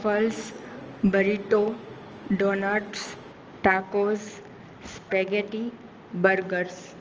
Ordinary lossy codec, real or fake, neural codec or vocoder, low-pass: Opus, 24 kbps; real; none; 7.2 kHz